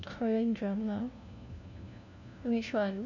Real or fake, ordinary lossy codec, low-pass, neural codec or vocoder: fake; none; 7.2 kHz; codec, 16 kHz, 1 kbps, FunCodec, trained on LibriTTS, 50 frames a second